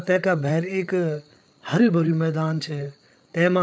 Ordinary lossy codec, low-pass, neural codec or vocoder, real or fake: none; none; codec, 16 kHz, 8 kbps, FreqCodec, larger model; fake